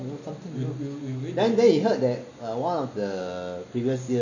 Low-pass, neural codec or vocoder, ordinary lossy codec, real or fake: 7.2 kHz; none; none; real